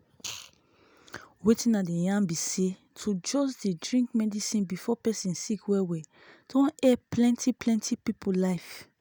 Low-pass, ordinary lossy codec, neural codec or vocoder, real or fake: none; none; none; real